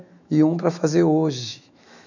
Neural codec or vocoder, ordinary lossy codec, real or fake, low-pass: autoencoder, 48 kHz, 128 numbers a frame, DAC-VAE, trained on Japanese speech; none; fake; 7.2 kHz